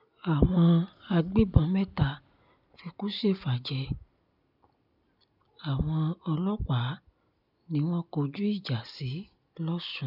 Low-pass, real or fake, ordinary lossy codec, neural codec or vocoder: 5.4 kHz; real; none; none